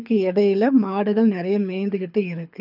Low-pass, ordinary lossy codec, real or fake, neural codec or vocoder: 5.4 kHz; none; fake; codec, 24 kHz, 6 kbps, HILCodec